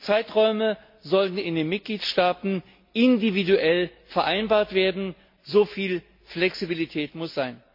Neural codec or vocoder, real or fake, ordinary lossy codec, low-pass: none; real; MP3, 32 kbps; 5.4 kHz